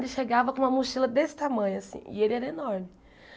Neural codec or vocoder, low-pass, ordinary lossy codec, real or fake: none; none; none; real